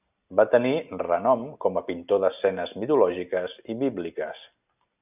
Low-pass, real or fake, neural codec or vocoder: 3.6 kHz; real; none